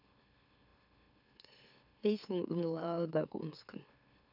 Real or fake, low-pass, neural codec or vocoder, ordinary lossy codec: fake; 5.4 kHz; autoencoder, 44.1 kHz, a latent of 192 numbers a frame, MeloTTS; none